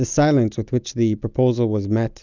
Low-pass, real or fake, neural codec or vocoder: 7.2 kHz; real; none